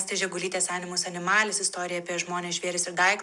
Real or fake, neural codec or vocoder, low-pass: real; none; 10.8 kHz